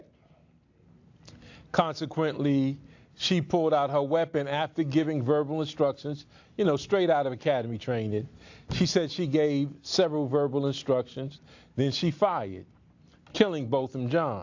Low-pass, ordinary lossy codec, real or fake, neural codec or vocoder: 7.2 kHz; AAC, 48 kbps; real; none